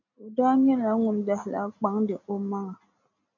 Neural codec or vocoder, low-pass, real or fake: none; 7.2 kHz; real